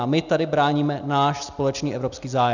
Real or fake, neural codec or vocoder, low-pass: real; none; 7.2 kHz